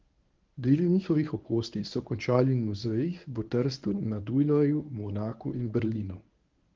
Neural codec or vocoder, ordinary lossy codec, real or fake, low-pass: codec, 24 kHz, 0.9 kbps, WavTokenizer, medium speech release version 1; Opus, 32 kbps; fake; 7.2 kHz